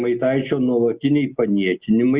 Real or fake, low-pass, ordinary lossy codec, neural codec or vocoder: real; 3.6 kHz; Opus, 64 kbps; none